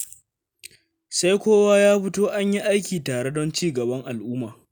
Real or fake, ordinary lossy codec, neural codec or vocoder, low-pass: real; none; none; none